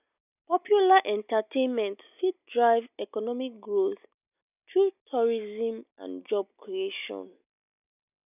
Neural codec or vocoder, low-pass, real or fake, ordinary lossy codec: none; 3.6 kHz; real; none